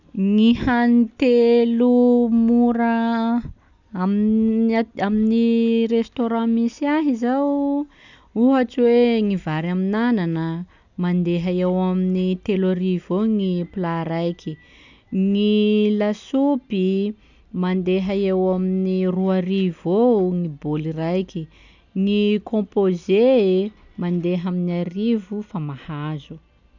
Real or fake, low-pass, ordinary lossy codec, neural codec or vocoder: real; 7.2 kHz; none; none